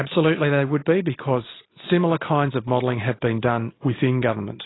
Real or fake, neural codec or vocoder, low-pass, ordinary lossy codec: real; none; 7.2 kHz; AAC, 16 kbps